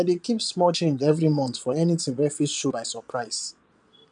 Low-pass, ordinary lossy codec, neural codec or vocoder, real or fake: 9.9 kHz; none; vocoder, 22.05 kHz, 80 mel bands, Vocos; fake